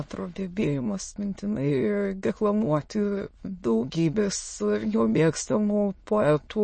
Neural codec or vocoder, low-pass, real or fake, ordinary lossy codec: autoencoder, 22.05 kHz, a latent of 192 numbers a frame, VITS, trained on many speakers; 9.9 kHz; fake; MP3, 32 kbps